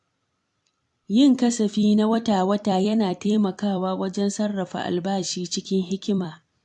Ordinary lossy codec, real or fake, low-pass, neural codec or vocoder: AAC, 64 kbps; fake; 10.8 kHz; vocoder, 44.1 kHz, 128 mel bands every 256 samples, BigVGAN v2